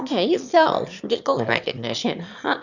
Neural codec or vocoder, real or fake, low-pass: autoencoder, 22.05 kHz, a latent of 192 numbers a frame, VITS, trained on one speaker; fake; 7.2 kHz